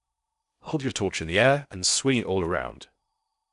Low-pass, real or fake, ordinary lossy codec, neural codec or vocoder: 10.8 kHz; fake; none; codec, 16 kHz in and 24 kHz out, 0.8 kbps, FocalCodec, streaming, 65536 codes